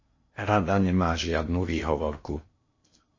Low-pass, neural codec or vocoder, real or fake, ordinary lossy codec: 7.2 kHz; codec, 16 kHz in and 24 kHz out, 0.8 kbps, FocalCodec, streaming, 65536 codes; fake; MP3, 32 kbps